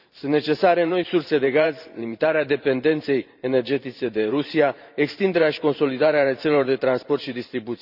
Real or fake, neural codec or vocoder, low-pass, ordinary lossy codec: fake; vocoder, 44.1 kHz, 128 mel bands every 512 samples, BigVGAN v2; 5.4 kHz; none